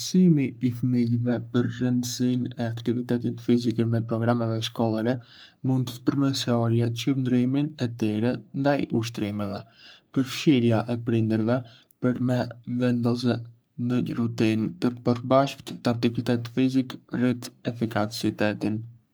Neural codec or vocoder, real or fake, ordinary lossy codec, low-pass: codec, 44.1 kHz, 3.4 kbps, Pupu-Codec; fake; none; none